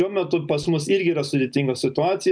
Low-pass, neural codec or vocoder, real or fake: 9.9 kHz; none; real